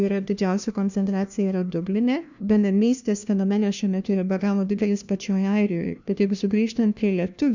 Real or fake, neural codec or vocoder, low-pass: fake; codec, 16 kHz, 1 kbps, FunCodec, trained on LibriTTS, 50 frames a second; 7.2 kHz